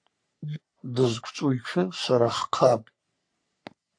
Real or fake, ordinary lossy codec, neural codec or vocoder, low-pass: fake; AAC, 64 kbps; codec, 44.1 kHz, 3.4 kbps, Pupu-Codec; 9.9 kHz